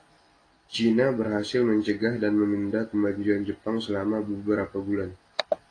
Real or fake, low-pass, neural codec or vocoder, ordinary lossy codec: real; 9.9 kHz; none; AAC, 32 kbps